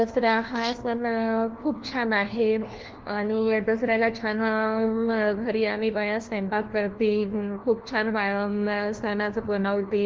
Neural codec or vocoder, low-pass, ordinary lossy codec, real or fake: codec, 16 kHz, 1 kbps, FunCodec, trained on LibriTTS, 50 frames a second; 7.2 kHz; Opus, 16 kbps; fake